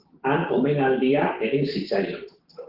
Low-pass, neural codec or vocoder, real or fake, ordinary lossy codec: 5.4 kHz; codec, 16 kHz, 6 kbps, DAC; fake; Opus, 16 kbps